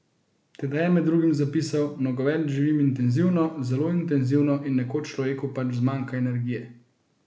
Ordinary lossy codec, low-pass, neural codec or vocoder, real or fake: none; none; none; real